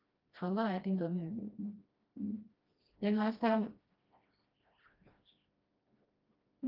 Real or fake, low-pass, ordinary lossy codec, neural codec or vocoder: fake; 5.4 kHz; Opus, 24 kbps; codec, 16 kHz, 1 kbps, FreqCodec, smaller model